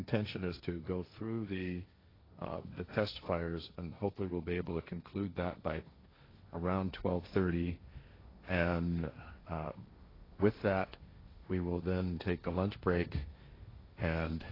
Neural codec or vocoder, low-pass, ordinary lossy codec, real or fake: codec, 16 kHz, 1.1 kbps, Voila-Tokenizer; 5.4 kHz; AAC, 24 kbps; fake